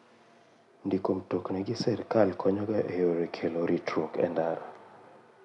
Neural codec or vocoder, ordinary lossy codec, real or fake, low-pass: none; none; real; 10.8 kHz